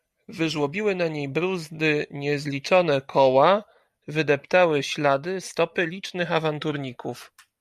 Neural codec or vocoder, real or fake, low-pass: none; real; 14.4 kHz